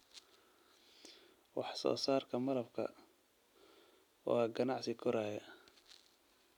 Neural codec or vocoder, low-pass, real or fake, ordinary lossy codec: none; 19.8 kHz; real; none